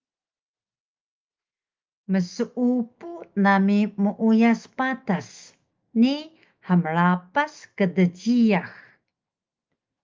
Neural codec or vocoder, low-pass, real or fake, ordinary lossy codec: autoencoder, 48 kHz, 128 numbers a frame, DAC-VAE, trained on Japanese speech; 7.2 kHz; fake; Opus, 24 kbps